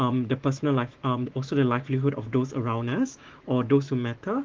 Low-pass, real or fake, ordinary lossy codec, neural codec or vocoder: 7.2 kHz; fake; Opus, 16 kbps; autoencoder, 48 kHz, 128 numbers a frame, DAC-VAE, trained on Japanese speech